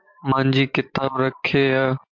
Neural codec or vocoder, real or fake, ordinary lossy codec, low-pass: vocoder, 44.1 kHz, 128 mel bands every 512 samples, BigVGAN v2; fake; MP3, 64 kbps; 7.2 kHz